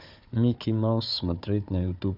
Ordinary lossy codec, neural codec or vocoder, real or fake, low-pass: none; codec, 16 kHz, 4 kbps, FunCodec, trained on Chinese and English, 50 frames a second; fake; 5.4 kHz